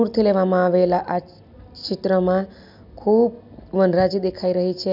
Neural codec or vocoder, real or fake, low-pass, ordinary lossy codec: none; real; 5.4 kHz; none